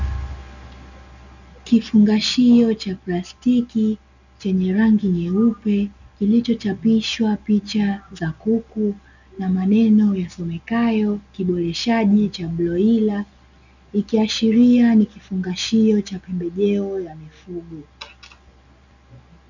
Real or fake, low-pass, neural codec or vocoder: real; 7.2 kHz; none